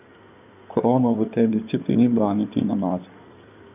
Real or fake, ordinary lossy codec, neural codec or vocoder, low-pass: fake; none; codec, 16 kHz in and 24 kHz out, 2.2 kbps, FireRedTTS-2 codec; 3.6 kHz